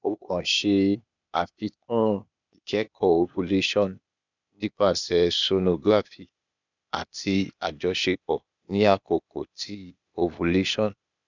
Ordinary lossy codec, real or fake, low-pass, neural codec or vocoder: none; fake; 7.2 kHz; codec, 16 kHz, 0.8 kbps, ZipCodec